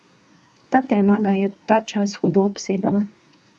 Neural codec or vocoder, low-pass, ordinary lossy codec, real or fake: codec, 24 kHz, 1 kbps, SNAC; none; none; fake